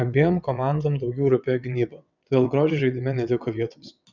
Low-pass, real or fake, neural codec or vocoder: 7.2 kHz; fake; vocoder, 22.05 kHz, 80 mel bands, WaveNeXt